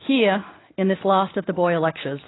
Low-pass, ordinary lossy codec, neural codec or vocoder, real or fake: 7.2 kHz; AAC, 16 kbps; none; real